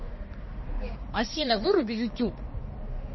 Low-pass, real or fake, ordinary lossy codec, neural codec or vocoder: 7.2 kHz; fake; MP3, 24 kbps; codec, 16 kHz, 2 kbps, X-Codec, HuBERT features, trained on balanced general audio